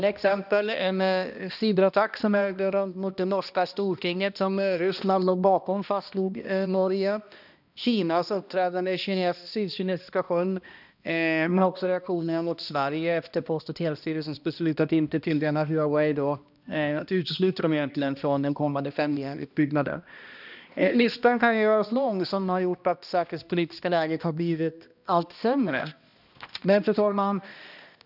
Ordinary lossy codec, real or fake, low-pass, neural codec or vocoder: none; fake; 5.4 kHz; codec, 16 kHz, 1 kbps, X-Codec, HuBERT features, trained on balanced general audio